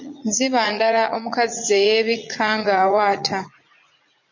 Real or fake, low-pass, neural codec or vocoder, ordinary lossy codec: fake; 7.2 kHz; vocoder, 44.1 kHz, 80 mel bands, Vocos; MP3, 48 kbps